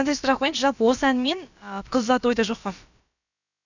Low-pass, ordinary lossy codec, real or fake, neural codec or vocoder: 7.2 kHz; none; fake; codec, 16 kHz, about 1 kbps, DyCAST, with the encoder's durations